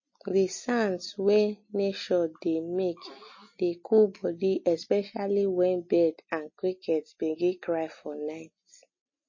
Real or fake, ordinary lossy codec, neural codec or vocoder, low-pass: real; MP3, 32 kbps; none; 7.2 kHz